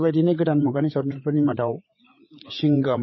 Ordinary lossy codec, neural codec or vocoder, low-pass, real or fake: MP3, 24 kbps; codec, 16 kHz, 4 kbps, FreqCodec, larger model; 7.2 kHz; fake